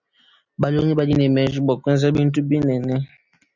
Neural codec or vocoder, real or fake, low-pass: none; real; 7.2 kHz